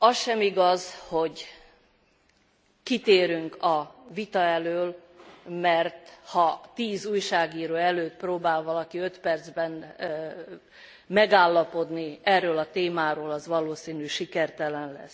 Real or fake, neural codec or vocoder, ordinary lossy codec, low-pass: real; none; none; none